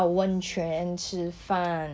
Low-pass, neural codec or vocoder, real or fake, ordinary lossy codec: none; none; real; none